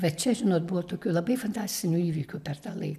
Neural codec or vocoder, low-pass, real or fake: none; 14.4 kHz; real